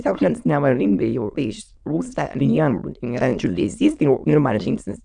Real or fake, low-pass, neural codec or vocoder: fake; 9.9 kHz; autoencoder, 22.05 kHz, a latent of 192 numbers a frame, VITS, trained on many speakers